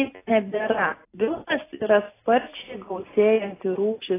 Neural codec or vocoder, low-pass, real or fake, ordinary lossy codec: none; 3.6 kHz; real; AAC, 16 kbps